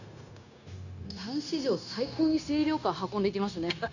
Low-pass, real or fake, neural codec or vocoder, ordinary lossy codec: 7.2 kHz; fake; codec, 16 kHz, 0.9 kbps, LongCat-Audio-Codec; none